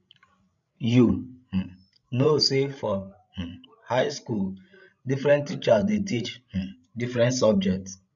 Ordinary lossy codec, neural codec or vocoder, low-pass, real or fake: none; codec, 16 kHz, 16 kbps, FreqCodec, larger model; 7.2 kHz; fake